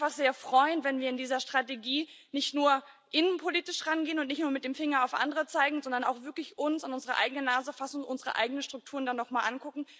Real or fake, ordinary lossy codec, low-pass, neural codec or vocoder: real; none; none; none